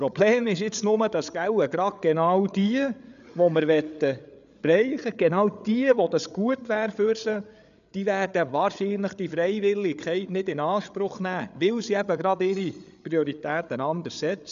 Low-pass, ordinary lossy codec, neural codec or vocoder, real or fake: 7.2 kHz; none; codec, 16 kHz, 8 kbps, FreqCodec, larger model; fake